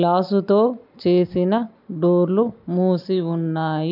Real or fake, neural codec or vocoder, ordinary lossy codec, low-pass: real; none; none; 5.4 kHz